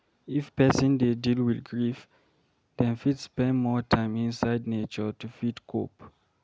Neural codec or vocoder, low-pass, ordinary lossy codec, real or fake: none; none; none; real